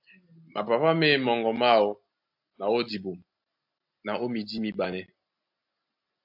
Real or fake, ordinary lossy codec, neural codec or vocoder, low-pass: real; AAC, 48 kbps; none; 5.4 kHz